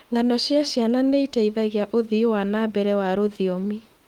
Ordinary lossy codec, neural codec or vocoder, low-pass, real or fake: Opus, 24 kbps; autoencoder, 48 kHz, 32 numbers a frame, DAC-VAE, trained on Japanese speech; 19.8 kHz; fake